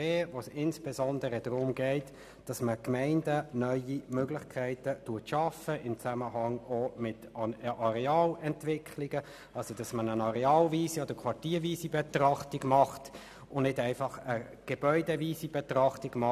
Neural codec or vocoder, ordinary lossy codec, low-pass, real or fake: none; none; 14.4 kHz; real